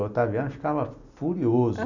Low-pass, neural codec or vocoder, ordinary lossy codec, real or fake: 7.2 kHz; none; none; real